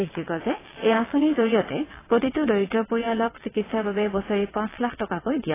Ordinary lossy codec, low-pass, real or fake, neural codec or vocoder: AAC, 16 kbps; 3.6 kHz; fake; vocoder, 22.05 kHz, 80 mel bands, WaveNeXt